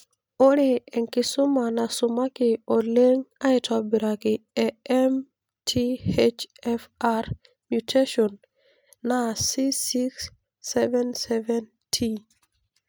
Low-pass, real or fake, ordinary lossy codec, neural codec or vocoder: none; real; none; none